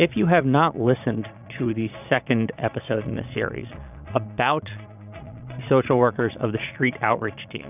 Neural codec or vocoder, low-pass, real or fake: vocoder, 22.05 kHz, 80 mel bands, Vocos; 3.6 kHz; fake